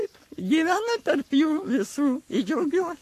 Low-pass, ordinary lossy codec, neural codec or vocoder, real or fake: 14.4 kHz; AAC, 64 kbps; codec, 44.1 kHz, 7.8 kbps, Pupu-Codec; fake